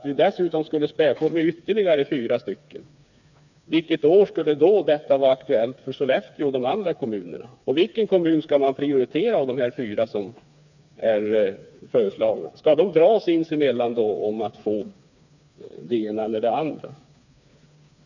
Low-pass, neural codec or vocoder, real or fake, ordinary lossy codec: 7.2 kHz; codec, 16 kHz, 4 kbps, FreqCodec, smaller model; fake; none